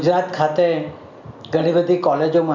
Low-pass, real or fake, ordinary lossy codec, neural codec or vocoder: 7.2 kHz; real; none; none